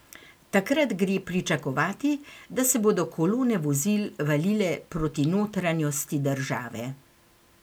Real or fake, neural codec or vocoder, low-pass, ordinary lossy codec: real; none; none; none